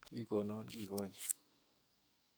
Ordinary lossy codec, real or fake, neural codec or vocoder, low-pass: none; fake; codec, 44.1 kHz, 2.6 kbps, SNAC; none